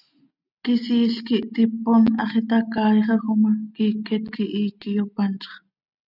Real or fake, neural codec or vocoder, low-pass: real; none; 5.4 kHz